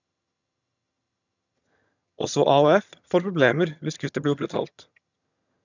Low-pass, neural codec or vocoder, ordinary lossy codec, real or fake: 7.2 kHz; vocoder, 22.05 kHz, 80 mel bands, HiFi-GAN; none; fake